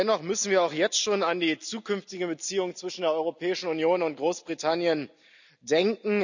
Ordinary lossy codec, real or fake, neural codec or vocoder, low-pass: none; real; none; 7.2 kHz